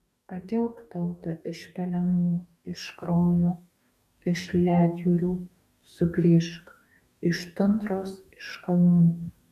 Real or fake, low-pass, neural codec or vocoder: fake; 14.4 kHz; codec, 44.1 kHz, 2.6 kbps, DAC